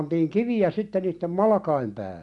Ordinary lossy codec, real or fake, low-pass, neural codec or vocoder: none; real; 10.8 kHz; none